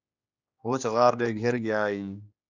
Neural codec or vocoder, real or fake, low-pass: codec, 16 kHz, 2 kbps, X-Codec, HuBERT features, trained on general audio; fake; 7.2 kHz